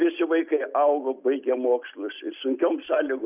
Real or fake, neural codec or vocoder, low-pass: real; none; 3.6 kHz